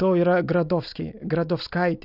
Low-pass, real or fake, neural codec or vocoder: 5.4 kHz; real; none